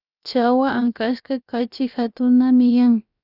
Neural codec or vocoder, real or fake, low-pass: codec, 16 kHz, about 1 kbps, DyCAST, with the encoder's durations; fake; 5.4 kHz